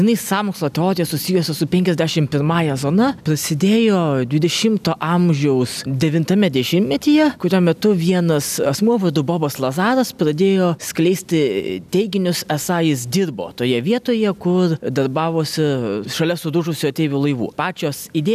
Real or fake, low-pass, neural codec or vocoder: real; 14.4 kHz; none